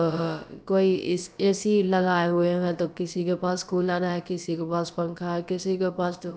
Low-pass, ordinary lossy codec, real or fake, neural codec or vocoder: none; none; fake; codec, 16 kHz, about 1 kbps, DyCAST, with the encoder's durations